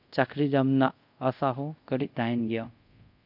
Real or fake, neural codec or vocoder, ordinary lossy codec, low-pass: fake; codec, 24 kHz, 0.5 kbps, DualCodec; none; 5.4 kHz